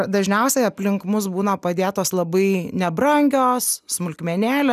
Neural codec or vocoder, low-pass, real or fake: none; 14.4 kHz; real